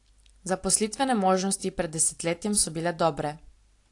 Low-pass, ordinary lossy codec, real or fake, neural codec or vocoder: 10.8 kHz; AAC, 48 kbps; real; none